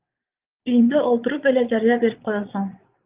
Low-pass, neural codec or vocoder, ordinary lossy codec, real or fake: 3.6 kHz; vocoder, 22.05 kHz, 80 mel bands, Vocos; Opus, 24 kbps; fake